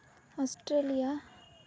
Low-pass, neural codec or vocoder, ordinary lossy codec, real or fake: none; none; none; real